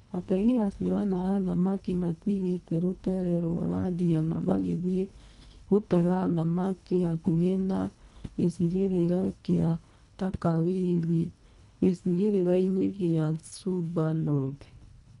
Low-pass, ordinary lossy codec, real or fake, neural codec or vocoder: 10.8 kHz; none; fake; codec, 24 kHz, 1.5 kbps, HILCodec